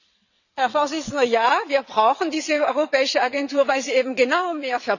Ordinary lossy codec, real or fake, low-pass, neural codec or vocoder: none; fake; 7.2 kHz; vocoder, 22.05 kHz, 80 mel bands, WaveNeXt